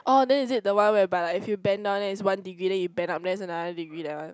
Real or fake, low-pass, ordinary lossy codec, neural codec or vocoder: real; none; none; none